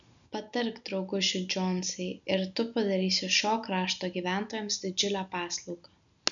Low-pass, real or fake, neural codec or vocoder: 7.2 kHz; real; none